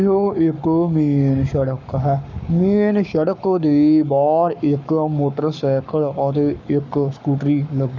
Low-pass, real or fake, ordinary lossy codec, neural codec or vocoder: 7.2 kHz; fake; none; codec, 44.1 kHz, 7.8 kbps, Pupu-Codec